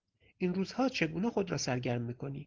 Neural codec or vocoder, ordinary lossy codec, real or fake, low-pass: none; Opus, 16 kbps; real; 7.2 kHz